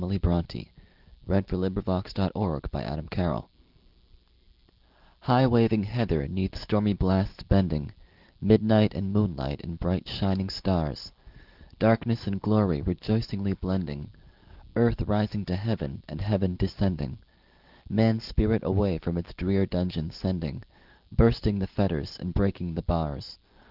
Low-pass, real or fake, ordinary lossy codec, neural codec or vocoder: 5.4 kHz; real; Opus, 16 kbps; none